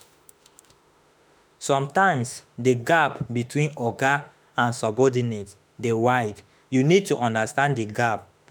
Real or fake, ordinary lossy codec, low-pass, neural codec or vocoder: fake; none; none; autoencoder, 48 kHz, 32 numbers a frame, DAC-VAE, trained on Japanese speech